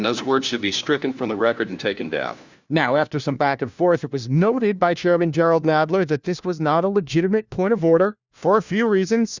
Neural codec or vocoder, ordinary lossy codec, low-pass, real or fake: codec, 16 kHz, 1 kbps, FunCodec, trained on LibriTTS, 50 frames a second; Opus, 64 kbps; 7.2 kHz; fake